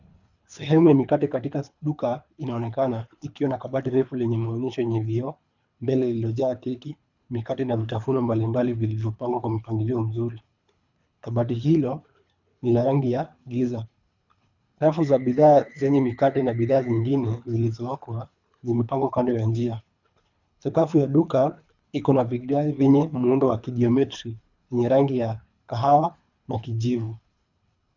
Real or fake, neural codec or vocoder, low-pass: fake; codec, 24 kHz, 3 kbps, HILCodec; 7.2 kHz